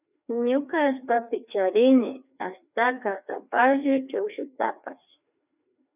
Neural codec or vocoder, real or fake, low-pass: codec, 16 kHz, 2 kbps, FreqCodec, larger model; fake; 3.6 kHz